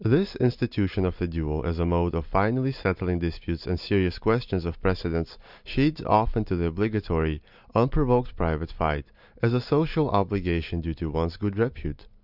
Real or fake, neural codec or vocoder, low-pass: real; none; 5.4 kHz